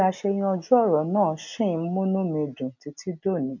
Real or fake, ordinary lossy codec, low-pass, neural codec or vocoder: real; none; 7.2 kHz; none